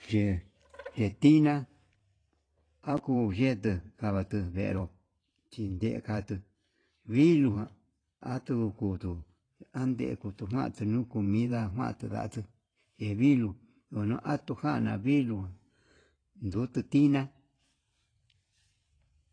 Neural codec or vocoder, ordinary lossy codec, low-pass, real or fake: vocoder, 44.1 kHz, 128 mel bands every 512 samples, BigVGAN v2; AAC, 32 kbps; 9.9 kHz; fake